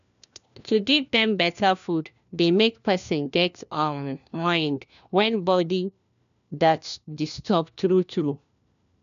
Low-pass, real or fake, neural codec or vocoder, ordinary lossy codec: 7.2 kHz; fake; codec, 16 kHz, 1 kbps, FunCodec, trained on LibriTTS, 50 frames a second; none